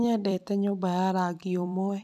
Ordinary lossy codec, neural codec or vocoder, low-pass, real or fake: none; vocoder, 44.1 kHz, 128 mel bands every 256 samples, BigVGAN v2; 14.4 kHz; fake